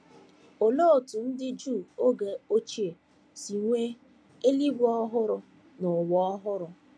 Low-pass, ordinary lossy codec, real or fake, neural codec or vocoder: none; none; real; none